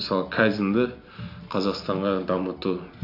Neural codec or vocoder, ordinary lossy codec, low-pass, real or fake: none; AAC, 32 kbps; 5.4 kHz; real